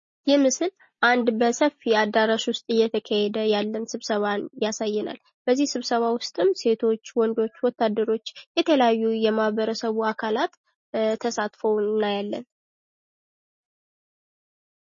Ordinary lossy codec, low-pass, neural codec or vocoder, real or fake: MP3, 32 kbps; 7.2 kHz; none; real